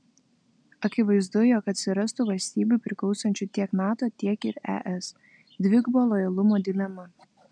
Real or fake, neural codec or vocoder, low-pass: real; none; 9.9 kHz